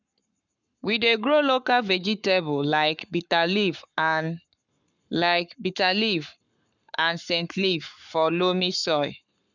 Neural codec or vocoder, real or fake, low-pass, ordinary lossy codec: codec, 44.1 kHz, 7.8 kbps, Pupu-Codec; fake; 7.2 kHz; none